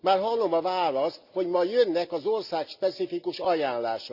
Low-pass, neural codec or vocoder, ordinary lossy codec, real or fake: 5.4 kHz; none; Opus, 64 kbps; real